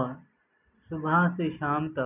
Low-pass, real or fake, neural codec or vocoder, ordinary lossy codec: 3.6 kHz; real; none; none